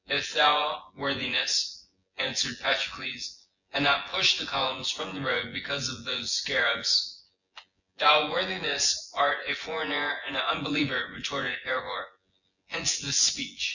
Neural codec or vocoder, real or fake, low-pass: vocoder, 24 kHz, 100 mel bands, Vocos; fake; 7.2 kHz